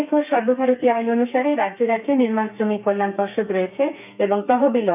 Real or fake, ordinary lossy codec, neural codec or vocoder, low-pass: fake; none; codec, 32 kHz, 1.9 kbps, SNAC; 3.6 kHz